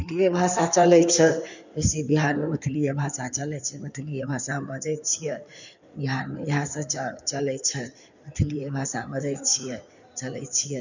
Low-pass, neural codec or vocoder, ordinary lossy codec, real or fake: 7.2 kHz; codec, 16 kHz in and 24 kHz out, 2.2 kbps, FireRedTTS-2 codec; none; fake